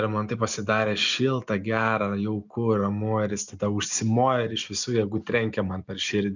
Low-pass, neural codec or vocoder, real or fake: 7.2 kHz; none; real